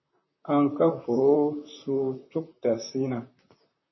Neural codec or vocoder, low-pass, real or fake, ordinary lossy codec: vocoder, 44.1 kHz, 128 mel bands, Pupu-Vocoder; 7.2 kHz; fake; MP3, 24 kbps